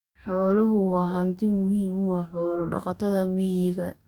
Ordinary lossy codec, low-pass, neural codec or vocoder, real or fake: none; 19.8 kHz; codec, 44.1 kHz, 2.6 kbps, DAC; fake